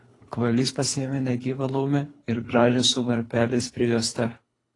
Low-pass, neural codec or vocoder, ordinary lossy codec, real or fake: 10.8 kHz; codec, 24 kHz, 3 kbps, HILCodec; AAC, 32 kbps; fake